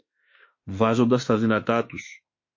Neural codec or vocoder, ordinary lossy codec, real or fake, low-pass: autoencoder, 48 kHz, 32 numbers a frame, DAC-VAE, trained on Japanese speech; MP3, 32 kbps; fake; 7.2 kHz